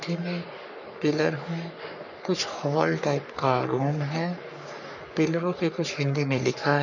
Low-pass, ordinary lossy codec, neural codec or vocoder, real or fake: 7.2 kHz; none; codec, 44.1 kHz, 3.4 kbps, Pupu-Codec; fake